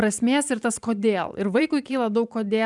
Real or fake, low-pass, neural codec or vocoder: fake; 10.8 kHz; vocoder, 44.1 kHz, 128 mel bands every 512 samples, BigVGAN v2